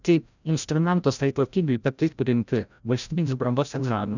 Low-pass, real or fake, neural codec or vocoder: 7.2 kHz; fake; codec, 16 kHz, 0.5 kbps, FreqCodec, larger model